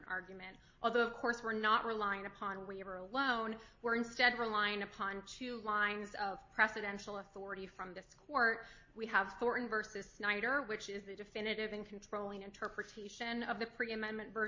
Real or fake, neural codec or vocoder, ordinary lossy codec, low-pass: real; none; MP3, 32 kbps; 7.2 kHz